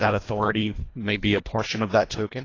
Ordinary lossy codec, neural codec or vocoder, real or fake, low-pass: AAC, 32 kbps; codec, 24 kHz, 1.5 kbps, HILCodec; fake; 7.2 kHz